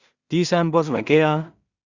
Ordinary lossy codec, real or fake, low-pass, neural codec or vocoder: Opus, 64 kbps; fake; 7.2 kHz; codec, 16 kHz in and 24 kHz out, 0.4 kbps, LongCat-Audio-Codec, two codebook decoder